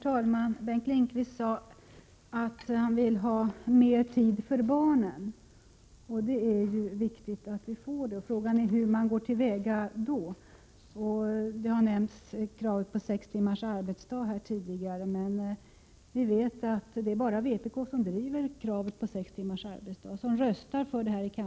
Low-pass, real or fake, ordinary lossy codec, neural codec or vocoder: none; real; none; none